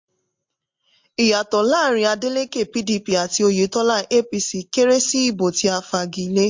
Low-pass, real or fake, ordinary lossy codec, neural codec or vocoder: 7.2 kHz; real; MP3, 48 kbps; none